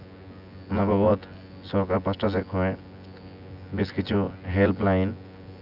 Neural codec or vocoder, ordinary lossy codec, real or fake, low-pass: vocoder, 24 kHz, 100 mel bands, Vocos; none; fake; 5.4 kHz